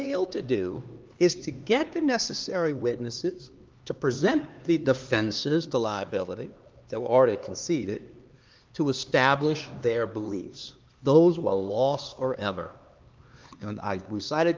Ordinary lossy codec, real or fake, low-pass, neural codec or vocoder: Opus, 24 kbps; fake; 7.2 kHz; codec, 16 kHz, 2 kbps, X-Codec, HuBERT features, trained on LibriSpeech